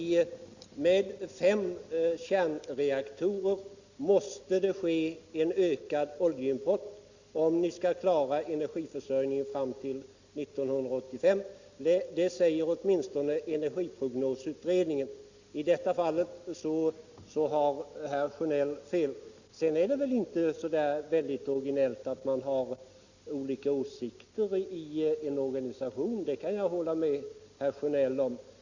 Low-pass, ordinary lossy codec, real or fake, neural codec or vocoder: 7.2 kHz; Opus, 64 kbps; real; none